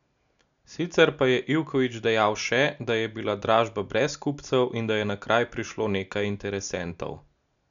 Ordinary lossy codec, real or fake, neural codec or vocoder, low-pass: none; real; none; 7.2 kHz